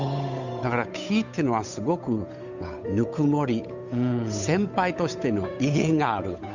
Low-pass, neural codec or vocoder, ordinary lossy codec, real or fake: 7.2 kHz; codec, 16 kHz, 8 kbps, FunCodec, trained on Chinese and English, 25 frames a second; none; fake